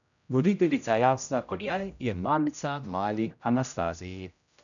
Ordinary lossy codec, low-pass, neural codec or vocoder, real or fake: none; 7.2 kHz; codec, 16 kHz, 0.5 kbps, X-Codec, HuBERT features, trained on general audio; fake